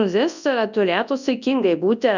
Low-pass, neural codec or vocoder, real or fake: 7.2 kHz; codec, 24 kHz, 0.9 kbps, WavTokenizer, large speech release; fake